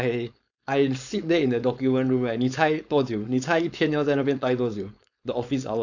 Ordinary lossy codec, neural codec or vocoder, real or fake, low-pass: none; codec, 16 kHz, 4.8 kbps, FACodec; fake; 7.2 kHz